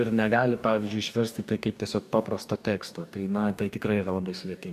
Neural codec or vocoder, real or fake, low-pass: codec, 44.1 kHz, 2.6 kbps, DAC; fake; 14.4 kHz